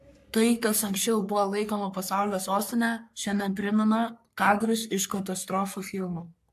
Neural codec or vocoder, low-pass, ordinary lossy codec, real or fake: codec, 44.1 kHz, 3.4 kbps, Pupu-Codec; 14.4 kHz; AAC, 96 kbps; fake